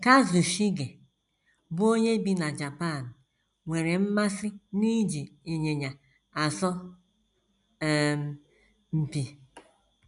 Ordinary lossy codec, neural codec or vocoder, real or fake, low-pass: none; none; real; 10.8 kHz